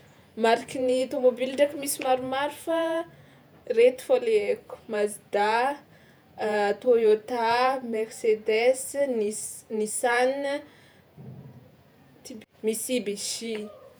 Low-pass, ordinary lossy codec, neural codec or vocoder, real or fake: none; none; vocoder, 48 kHz, 128 mel bands, Vocos; fake